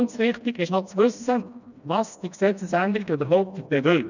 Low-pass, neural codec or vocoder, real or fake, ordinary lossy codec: 7.2 kHz; codec, 16 kHz, 1 kbps, FreqCodec, smaller model; fake; none